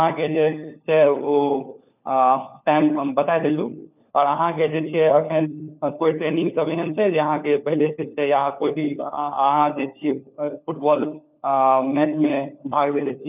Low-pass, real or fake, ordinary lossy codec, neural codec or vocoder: 3.6 kHz; fake; none; codec, 16 kHz, 4 kbps, FunCodec, trained on LibriTTS, 50 frames a second